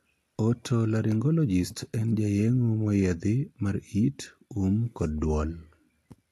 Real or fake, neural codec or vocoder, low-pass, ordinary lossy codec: real; none; 14.4 kHz; AAC, 64 kbps